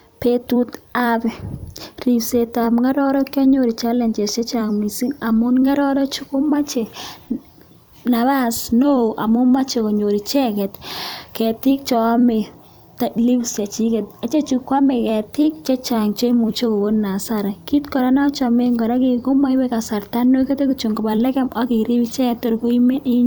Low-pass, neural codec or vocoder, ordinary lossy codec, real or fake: none; vocoder, 44.1 kHz, 128 mel bands every 256 samples, BigVGAN v2; none; fake